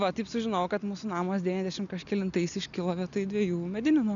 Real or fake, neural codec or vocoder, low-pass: real; none; 7.2 kHz